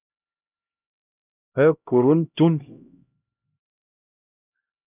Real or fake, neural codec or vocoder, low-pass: fake; codec, 16 kHz, 0.5 kbps, X-Codec, HuBERT features, trained on LibriSpeech; 3.6 kHz